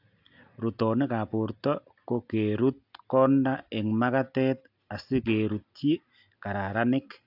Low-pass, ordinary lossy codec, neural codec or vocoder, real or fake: 5.4 kHz; AAC, 48 kbps; none; real